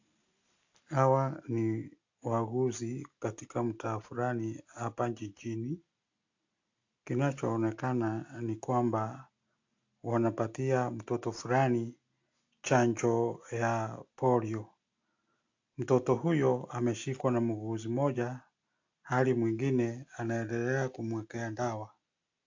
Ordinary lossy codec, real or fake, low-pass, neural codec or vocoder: AAC, 48 kbps; real; 7.2 kHz; none